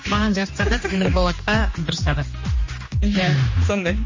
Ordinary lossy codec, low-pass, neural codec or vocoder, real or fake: MP3, 32 kbps; 7.2 kHz; codec, 16 kHz, 2 kbps, X-Codec, HuBERT features, trained on general audio; fake